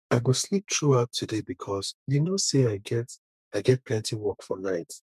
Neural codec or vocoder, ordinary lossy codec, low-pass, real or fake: codec, 32 kHz, 1.9 kbps, SNAC; MP3, 96 kbps; 14.4 kHz; fake